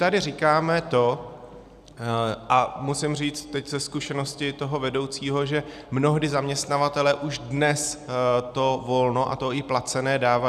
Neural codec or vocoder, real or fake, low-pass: none; real; 14.4 kHz